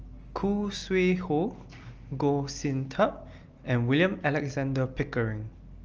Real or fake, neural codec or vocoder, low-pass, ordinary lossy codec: real; none; 7.2 kHz; Opus, 24 kbps